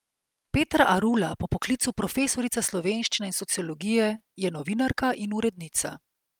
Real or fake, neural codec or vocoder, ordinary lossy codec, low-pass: fake; vocoder, 44.1 kHz, 128 mel bands every 256 samples, BigVGAN v2; Opus, 32 kbps; 19.8 kHz